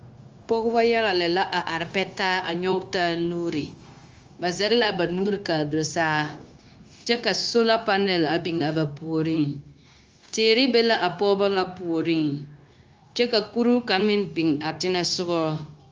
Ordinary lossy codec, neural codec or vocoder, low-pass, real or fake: Opus, 32 kbps; codec, 16 kHz, 0.9 kbps, LongCat-Audio-Codec; 7.2 kHz; fake